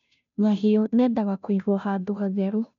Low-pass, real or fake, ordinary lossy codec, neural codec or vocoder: 7.2 kHz; fake; none; codec, 16 kHz, 0.5 kbps, FunCodec, trained on Chinese and English, 25 frames a second